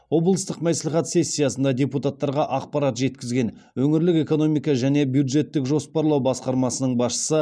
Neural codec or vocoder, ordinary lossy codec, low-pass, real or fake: none; none; none; real